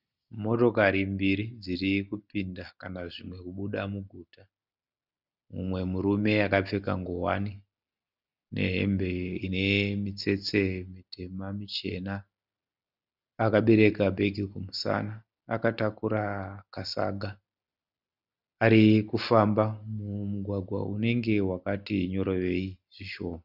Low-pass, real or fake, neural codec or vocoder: 5.4 kHz; real; none